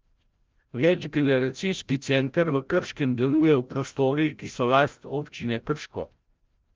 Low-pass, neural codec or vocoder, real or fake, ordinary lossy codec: 7.2 kHz; codec, 16 kHz, 0.5 kbps, FreqCodec, larger model; fake; Opus, 24 kbps